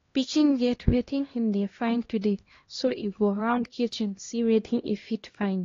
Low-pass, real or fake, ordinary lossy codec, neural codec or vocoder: 7.2 kHz; fake; AAC, 32 kbps; codec, 16 kHz, 1 kbps, X-Codec, HuBERT features, trained on LibriSpeech